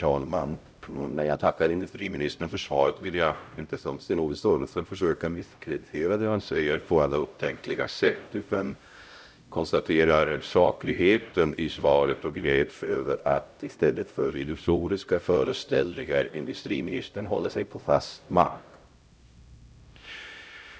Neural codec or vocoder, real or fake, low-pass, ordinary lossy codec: codec, 16 kHz, 0.5 kbps, X-Codec, HuBERT features, trained on LibriSpeech; fake; none; none